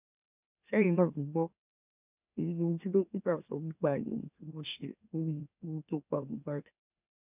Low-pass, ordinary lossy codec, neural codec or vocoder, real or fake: 3.6 kHz; none; autoencoder, 44.1 kHz, a latent of 192 numbers a frame, MeloTTS; fake